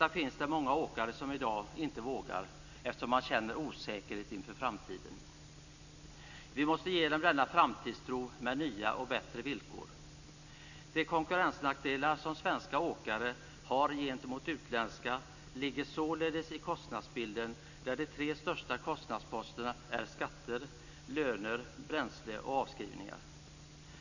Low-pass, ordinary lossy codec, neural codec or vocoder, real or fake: 7.2 kHz; none; none; real